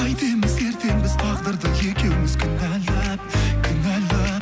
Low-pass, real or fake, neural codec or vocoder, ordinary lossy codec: none; real; none; none